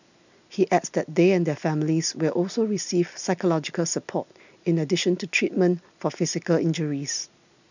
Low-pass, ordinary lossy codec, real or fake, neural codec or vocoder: 7.2 kHz; none; real; none